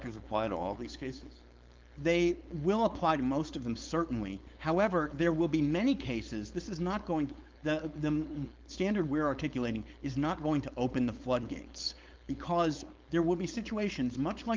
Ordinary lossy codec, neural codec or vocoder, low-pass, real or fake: Opus, 24 kbps; codec, 16 kHz, 4.8 kbps, FACodec; 7.2 kHz; fake